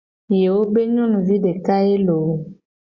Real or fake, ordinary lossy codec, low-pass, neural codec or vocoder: real; Opus, 64 kbps; 7.2 kHz; none